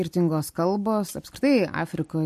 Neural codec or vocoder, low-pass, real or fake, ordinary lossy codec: none; 14.4 kHz; real; MP3, 64 kbps